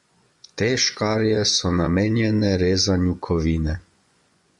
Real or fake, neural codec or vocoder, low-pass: fake; vocoder, 24 kHz, 100 mel bands, Vocos; 10.8 kHz